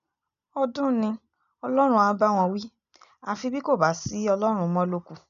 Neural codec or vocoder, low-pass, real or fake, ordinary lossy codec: none; 7.2 kHz; real; none